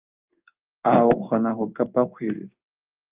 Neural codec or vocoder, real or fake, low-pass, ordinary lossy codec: codec, 16 kHz in and 24 kHz out, 1 kbps, XY-Tokenizer; fake; 3.6 kHz; Opus, 32 kbps